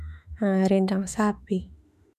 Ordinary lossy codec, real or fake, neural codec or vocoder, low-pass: none; fake; autoencoder, 48 kHz, 32 numbers a frame, DAC-VAE, trained on Japanese speech; 14.4 kHz